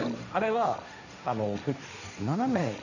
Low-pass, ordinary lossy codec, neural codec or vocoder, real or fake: 7.2 kHz; none; codec, 16 kHz, 1.1 kbps, Voila-Tokenizer; fake